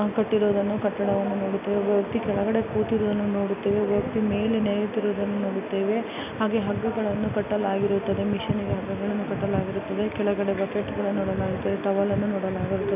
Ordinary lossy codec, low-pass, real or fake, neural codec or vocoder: none; 3.6 kHz; real; none